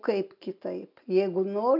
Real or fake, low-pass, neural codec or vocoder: real; 5.4 kHz; none